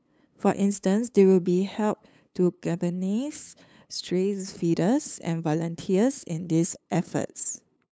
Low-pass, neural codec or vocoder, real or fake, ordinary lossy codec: none; codec, 16 kHz, 8 kbps, FunCodec, trained on LibriTTS, 25 frames a second; fake; none